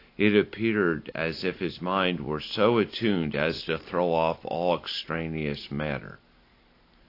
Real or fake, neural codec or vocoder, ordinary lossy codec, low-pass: real; none; AAC, 32 kbps; 5.4 kHz